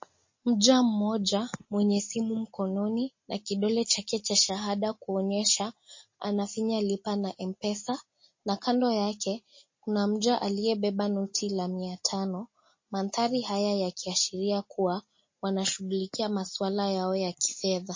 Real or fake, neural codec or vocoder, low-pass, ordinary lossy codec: real; none; 7.2 kHz; MP3, 32 kbps